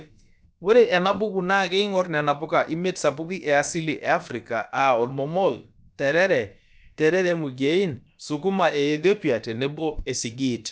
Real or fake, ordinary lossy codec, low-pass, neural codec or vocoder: fake; none; none; codec, 16 kHz, about 1 kbps, DyCAST, with the encoder's durations